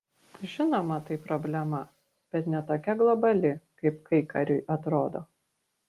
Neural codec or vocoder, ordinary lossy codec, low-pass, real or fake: none; Opus, 24 kbps; 14.4 kHz; real